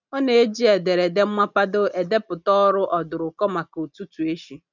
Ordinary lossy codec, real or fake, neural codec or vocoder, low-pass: none; real; none; 7.2 kHz